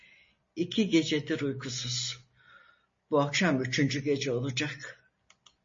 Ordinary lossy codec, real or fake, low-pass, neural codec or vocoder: MP3, 32 kbps; real; 7.2 kHz; none